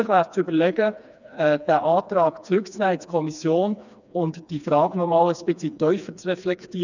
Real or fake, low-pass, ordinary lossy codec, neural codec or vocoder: fake; 7.2 kHz; none; codec, 16 kHz, 2 kbps, FreqCodec, smaller model